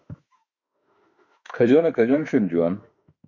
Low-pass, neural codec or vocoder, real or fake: 7.2 kHz; autoencoder, 48 kHz, 32 numbers a frame, DAC-VAE, trained on Japanese speech; fake